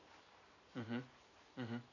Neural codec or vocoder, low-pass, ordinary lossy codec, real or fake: vocoder, 44.1 kHz, 128 mel bands every 512 samples, BigVGAN v2; 7.2 kHz; none; fake